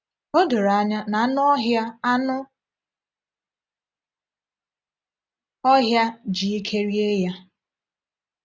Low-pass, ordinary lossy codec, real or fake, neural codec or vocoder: none; none; real; none